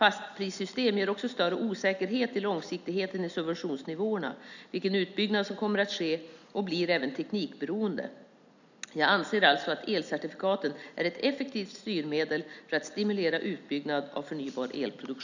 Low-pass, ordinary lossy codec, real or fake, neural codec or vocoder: 7.2 kHz; none; real; none